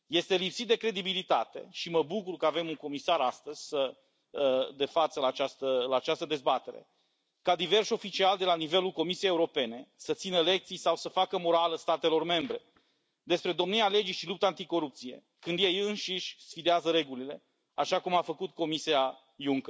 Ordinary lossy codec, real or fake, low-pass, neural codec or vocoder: none; real; none; none